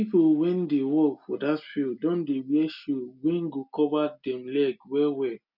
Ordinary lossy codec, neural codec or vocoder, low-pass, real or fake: none; none; 5.4 kHz; real